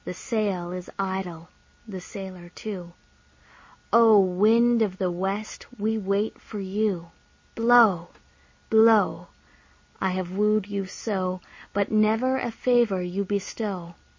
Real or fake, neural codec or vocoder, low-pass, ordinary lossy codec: real; none; 7.2 kHz; MP3, 32 kbps